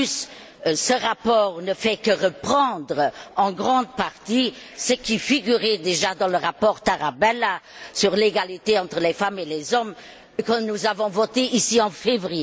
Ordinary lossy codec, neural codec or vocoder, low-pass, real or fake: none; none; none; real